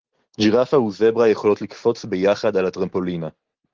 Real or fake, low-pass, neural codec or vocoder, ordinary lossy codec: real; 7.2 kHz; none; Opus, 16 kbps